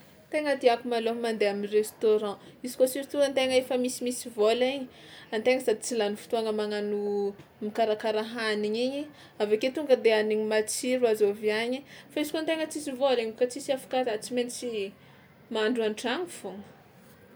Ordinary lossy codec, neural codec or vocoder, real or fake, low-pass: none; none; real; none